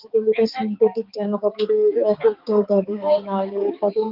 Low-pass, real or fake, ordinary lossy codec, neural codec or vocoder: 5.4 kHz; fake; Opus, 24 kbps; codec, 16 kHz, 4 kbps, X-Codec, HuBERT features, trained on balanced general audio